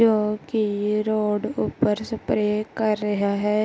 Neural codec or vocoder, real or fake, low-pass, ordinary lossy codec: none; real; none; none